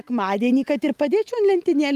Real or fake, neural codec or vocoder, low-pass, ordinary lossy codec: real; none; 14.4 kHz; Opus, 32 kbps